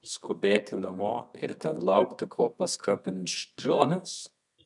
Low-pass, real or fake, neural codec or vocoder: 10.8 kHz; fake; codec, 24 kHz, 0.9 kbps, WavTokenizer, medium music audio release